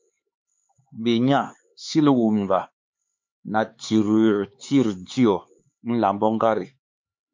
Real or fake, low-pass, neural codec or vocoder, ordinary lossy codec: fake; 7.2 kHz; codec, 16 kHz, 4 kbps, X-Codec, HuBERT features, trained on LibriSpeech; MP3, 48 kbps